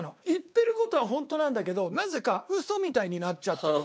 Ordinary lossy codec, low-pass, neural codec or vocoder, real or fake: none; none; codec, 16 kHz, 2 kbps, X-Codec, WavLM features, trained on Multilingual LibriSpeech; fake